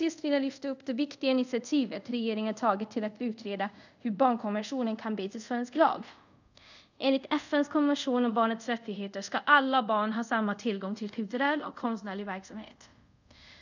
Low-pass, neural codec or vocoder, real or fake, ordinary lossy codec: 7.2 kHz; codec, 24 kHz, 0.5 kbps, DualCodec; fake; none